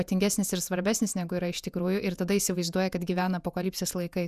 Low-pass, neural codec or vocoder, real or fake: 14.4 kHz; vocoder, 48 kHz, 128 mel bands, Vocos; fake